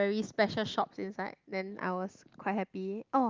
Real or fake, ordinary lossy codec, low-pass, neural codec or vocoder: real; Opus, 32 kbps; 7.2 kHz; none